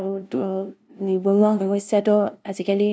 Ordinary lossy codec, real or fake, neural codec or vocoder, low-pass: none; fake; codec, 16 kHz, 0.5 kbps, FunCodec, trained on LibriTTS, 25 frames a second; none